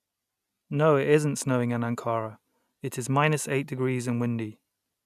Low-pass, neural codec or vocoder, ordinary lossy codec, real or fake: 14.4 kHz; none; none; real